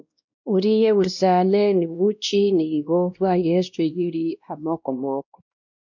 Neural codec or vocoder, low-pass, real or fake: codec, 16 kHz, 1 kbps, X-Codec, WavLM features, trained on Multilingual LibriSpeech; 7.2 kHz; fake